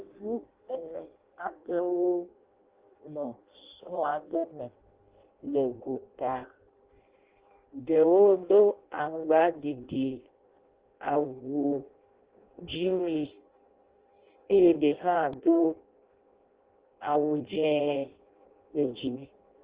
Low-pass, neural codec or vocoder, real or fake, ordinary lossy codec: 3.6 kHz; codec, 16 kHz in and 24 kHz out, 0.6 kbps, FireRedTTS-2 codec; fake; Opus, 16 kbps